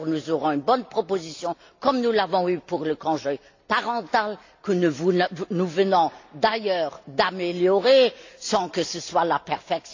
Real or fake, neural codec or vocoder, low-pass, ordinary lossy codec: real; none; 7.2 kHz; AAC, 48 kbps